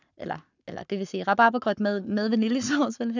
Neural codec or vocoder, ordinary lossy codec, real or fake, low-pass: codec, 44.1 kHz, 7.8 kbps, Pupu-Codec; none; fake; 7.2 kHz